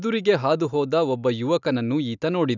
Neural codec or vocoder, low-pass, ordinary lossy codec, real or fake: none; 7.2 kHz; none; real